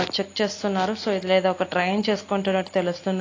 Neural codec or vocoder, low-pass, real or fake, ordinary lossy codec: none; 7.2 kHz; real; AAC, 32 kbps